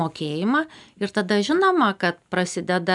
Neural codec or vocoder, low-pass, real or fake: none; 10.8 kHz; real